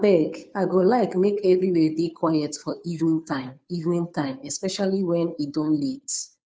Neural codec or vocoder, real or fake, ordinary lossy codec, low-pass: codec, 16 kHz, 2 kbps, FunCodec, trained on Chinese and English, 25 frames a second; fake; none; none